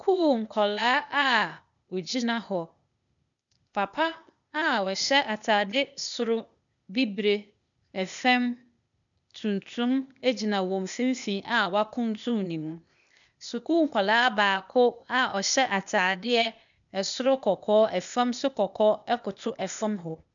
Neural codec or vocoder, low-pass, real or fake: codec, 16 kHz, 0.8 kbps, ZipCodec; 7.2 kHz; fake